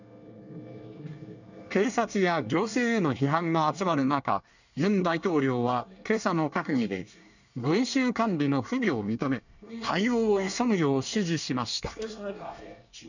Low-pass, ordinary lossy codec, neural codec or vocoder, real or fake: 7.2 kHz; none; codec, 24 kHz, 1 kbps, SNAC; fake